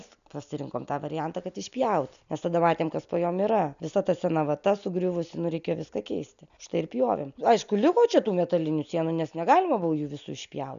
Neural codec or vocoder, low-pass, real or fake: none; 7.2 kHz; real